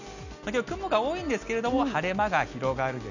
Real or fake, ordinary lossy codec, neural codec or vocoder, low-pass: real; none; none; 7.2 kHz